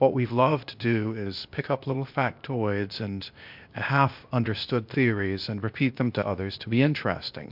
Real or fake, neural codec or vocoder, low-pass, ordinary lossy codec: fake; codec, 16 kHz, 0.8 kbps, ZipCodec; 5.4 kHz; MP3, 48 kbps